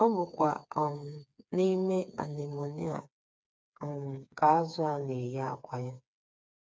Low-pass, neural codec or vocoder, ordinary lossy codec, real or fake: none; codec, 16 kHz, 4 kbps, FreqCodec, smaller model; none; fake